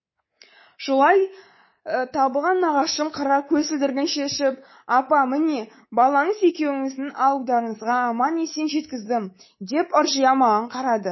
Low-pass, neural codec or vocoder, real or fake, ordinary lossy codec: 7.2 kHz; codec, 24 kHz, 3.1 kbps, DualCodec; fake; MP3, 24 kbps